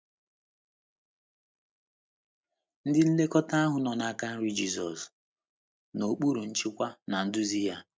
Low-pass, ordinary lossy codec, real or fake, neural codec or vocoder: none; none; real; none